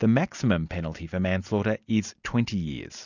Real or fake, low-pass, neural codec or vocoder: real; 7.2 kHz; none